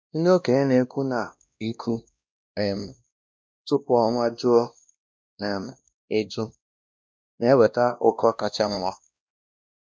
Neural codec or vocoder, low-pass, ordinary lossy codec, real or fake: codec, 16 kHz, 1 kbps, X-Codec, WavLM features, trained on Multilingual LibriSpeech; none; none; fake